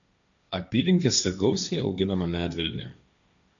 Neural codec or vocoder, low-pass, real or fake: codec, 16 kHz, 1.1 kbps, Voila-Tokenizer; 7.2 kHz; fake